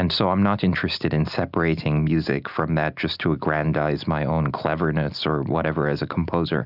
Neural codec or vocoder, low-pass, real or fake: none; 5.4 kHz; real